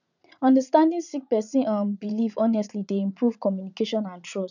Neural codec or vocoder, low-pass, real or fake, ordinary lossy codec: vocoder, 44.1 kHz, 80 mel bands, Vocos; 7.2 kHz; fake; none